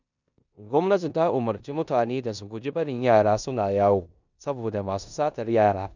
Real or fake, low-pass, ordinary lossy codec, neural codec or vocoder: fake; 7.2 kHz; none; codec, 16 kHz in and 24 kHz out, 0.9 kbps, LongCat-Audio-Codec, four codebook decoder